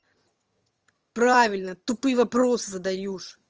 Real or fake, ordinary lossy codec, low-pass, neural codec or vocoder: fake; Opus, 24 kbps; 7.2 kHz; vocoder, 22.05 kHz, 80 mel bands, HiFi-GAN